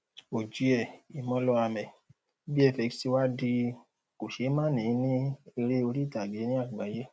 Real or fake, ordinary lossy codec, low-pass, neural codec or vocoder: real; none; none; none